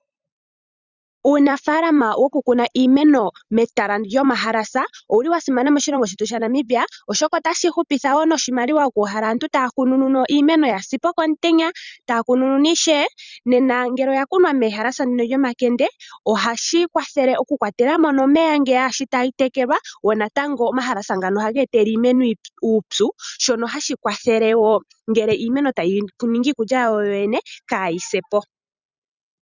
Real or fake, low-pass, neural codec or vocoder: fake; 7.2 kHz; vocoder, 44.1 kHz, 128 mel bands every 256 samples, BigVGAN v2